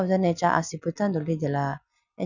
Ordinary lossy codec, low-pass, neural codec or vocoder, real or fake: none; 7.2 kHz; none; real